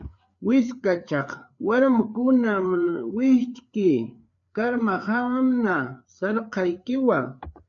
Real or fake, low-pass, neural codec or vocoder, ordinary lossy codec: fake; 7.2 kHz; codec, 16 kHz, 4 kbps, FreqCodec, larger model; MP3, 64 kbps